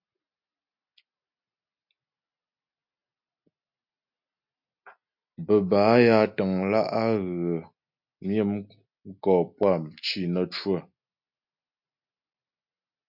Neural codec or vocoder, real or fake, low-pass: none; real; 5.4 kHz